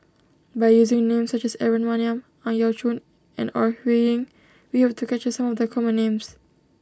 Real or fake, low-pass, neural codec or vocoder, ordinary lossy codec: real; none; none; none